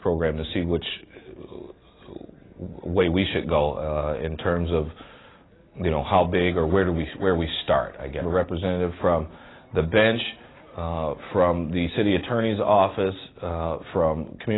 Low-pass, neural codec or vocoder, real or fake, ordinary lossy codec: 7.2 kHz; none; real; AAC, 16 kbps